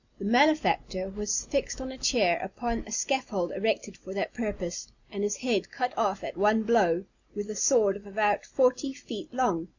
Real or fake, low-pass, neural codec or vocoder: real; 7.2 kHz; none